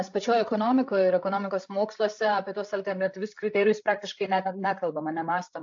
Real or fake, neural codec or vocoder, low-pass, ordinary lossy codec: fake; codec, 16 kHz, 8 kbps, FreqCodec, larger model; 7.2 kHz; AAC, 64 kbps